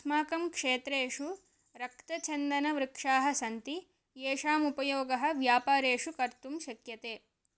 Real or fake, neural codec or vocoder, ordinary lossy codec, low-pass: real; none; none; none